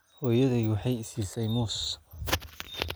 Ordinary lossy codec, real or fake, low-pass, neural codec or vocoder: none; real; none; none